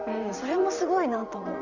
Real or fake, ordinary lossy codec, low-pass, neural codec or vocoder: fake; none; 7.2 kHz; vocoder, 44.1 kHz, 128 mel bands, Pupu-Vocoder